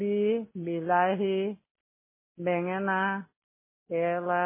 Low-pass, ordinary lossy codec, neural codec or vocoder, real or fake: 3.6 kHz; MP3, 16 kbps; none; real